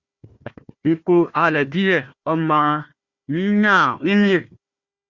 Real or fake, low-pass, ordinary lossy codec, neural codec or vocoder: fake; 7.2 kHz; Opus, 64 kbps; codec, 16 kHz, 1 kbps, FunCodec, trained on Chinese and English, 50 frames a second